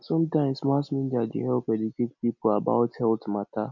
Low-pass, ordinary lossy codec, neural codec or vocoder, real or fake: 7.2 kHz; none; none; real